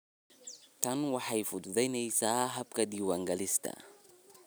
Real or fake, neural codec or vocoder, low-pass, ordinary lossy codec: real; none; none; none